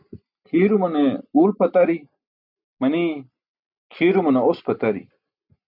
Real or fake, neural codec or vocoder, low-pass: real; none; 5.4 kHz